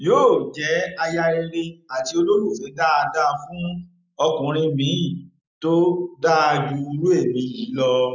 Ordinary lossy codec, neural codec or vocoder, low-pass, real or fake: none; none; 7.2 kHz; real